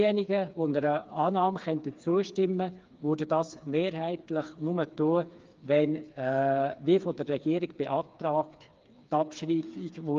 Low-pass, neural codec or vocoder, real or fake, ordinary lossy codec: 7.2 kHz; codec, 16 kHz, 4 kbps, FreqCodec, smaller model; fake; Opus, 32 kbps